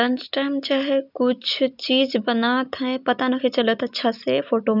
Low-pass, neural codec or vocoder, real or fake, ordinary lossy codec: 5.4 kHz; none; real; none